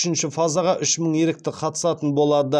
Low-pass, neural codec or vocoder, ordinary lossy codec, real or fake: none; none; none; real